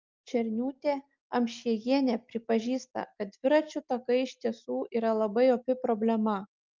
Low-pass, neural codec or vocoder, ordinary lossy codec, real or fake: 7.2 kHz; none; Opus, 24 kbps; real